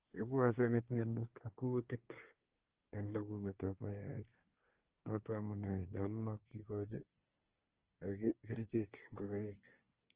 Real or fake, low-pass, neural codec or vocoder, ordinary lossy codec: fake; 3.6 kHz; codec, 24 kHz, 1 kbps, SNAC; Opus, 16 kbps